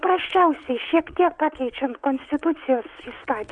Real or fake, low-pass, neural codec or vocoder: fake; 9.9 kHz; vocoder, 22.05 kHz, 80 mel bands, WaveNeXt